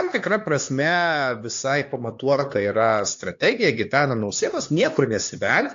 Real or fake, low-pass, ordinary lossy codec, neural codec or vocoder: fake; 7.2 kHz; AAC, 48 kbps; codec, 16 kHz, 2 kbps, X-Codec, HuBERT features, trained on LibriSpeech